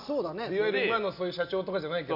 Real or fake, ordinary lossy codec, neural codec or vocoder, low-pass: real; none; none; 5.4 kHz